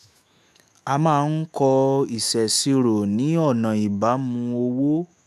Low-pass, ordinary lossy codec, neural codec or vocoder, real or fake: 14.4 kHz; none; autoencoder, 48 kHz, 128 numbers a frame, DAC-VAE, trained on Japanese speech; fake